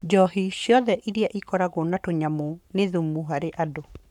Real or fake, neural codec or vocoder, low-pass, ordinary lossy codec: fake; codec, 44.1 kHz, 7.8 kbps, Pupu-Codec; 19.8 kHz; none